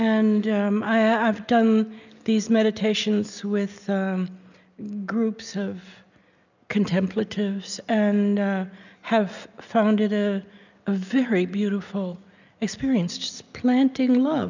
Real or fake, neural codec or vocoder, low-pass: real; none; 7.2 kHz